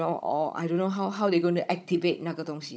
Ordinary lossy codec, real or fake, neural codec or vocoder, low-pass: none; fake; codec, 16 kHz, 16 kbps, FunCodec, trained on Chinese and English, 50 frames a second; none